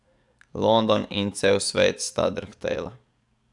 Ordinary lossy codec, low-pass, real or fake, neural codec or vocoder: none; 10.8 kHz; fake; codec, 44.1 kHz, 7.8 kbps, DAC